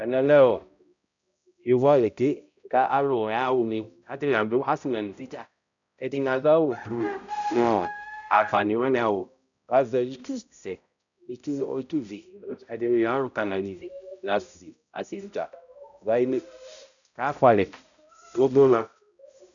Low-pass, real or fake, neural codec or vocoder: 7.2 kHz; fake; codec, 16 kHz, 0.5 kbps, X-Codec, HuBERT features, trained on balanced general audio